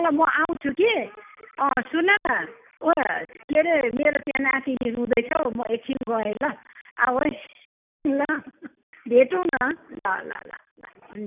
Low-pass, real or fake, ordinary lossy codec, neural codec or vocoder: 3.6 kHz; real; none; none